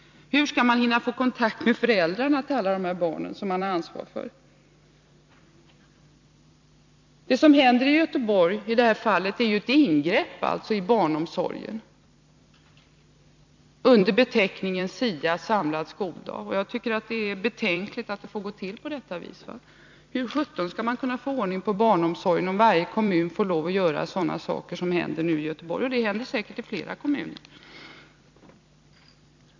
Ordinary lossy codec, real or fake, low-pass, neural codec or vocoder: MP3, 64 kbps; fake; 7.2 kHz; vocoder, 44.1 kHz, 128 mel bands every 256 samples, BigVGAN v2